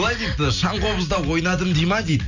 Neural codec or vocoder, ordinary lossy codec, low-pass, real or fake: none; none; 7.2 kHz; real